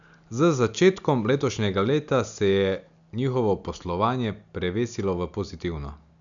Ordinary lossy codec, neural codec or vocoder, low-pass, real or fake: none; none; 7.2 kHz; real